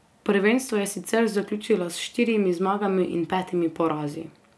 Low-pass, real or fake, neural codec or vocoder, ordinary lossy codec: none; real; none; none